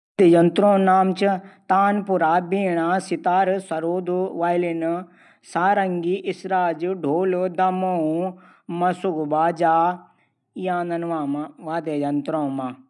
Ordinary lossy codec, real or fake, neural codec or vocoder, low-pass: none; real; none; 10.8 kHz